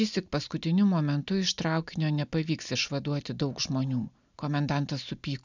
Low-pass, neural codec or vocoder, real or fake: 7.2 kHz; none; real